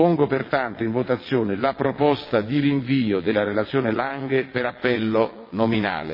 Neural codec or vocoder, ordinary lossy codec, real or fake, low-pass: vocoder, 22.05 kHz, 80 mel bands, WaveNeXt; MP3, 24 kbps; fake; 5.4 kHz